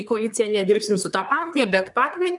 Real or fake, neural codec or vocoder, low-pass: fake; codec, 24 kHz, 1 kbps, SNAC; 10.8 kHz